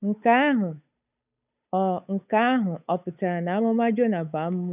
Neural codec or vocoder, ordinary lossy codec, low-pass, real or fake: codec, 16 kHz, 8 kbps, FunCodec, trained on Chinese and English, 25 frames a second; none; 3.6 kHz; fake